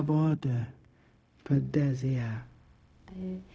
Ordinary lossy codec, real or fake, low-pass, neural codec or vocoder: none; fake; none; codec, 16 kHz, 0.4 kbps, LongCat-Audio-Codec